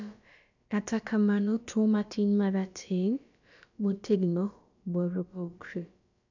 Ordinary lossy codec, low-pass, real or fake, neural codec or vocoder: none; 7.2 kHz; fake; codec, 16 kHz, about 1 kbps, DyCAST, with the encoder's durations